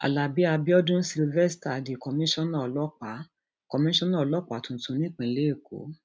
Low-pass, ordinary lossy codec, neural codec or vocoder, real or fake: none; none; none; real